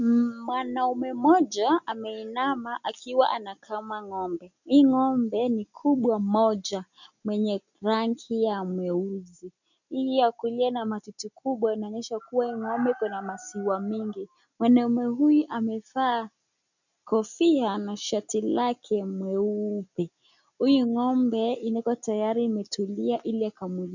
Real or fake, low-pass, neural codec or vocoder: real; 7.2 kHz; none